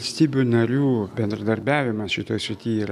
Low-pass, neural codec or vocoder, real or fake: 14.4 kHz; none; real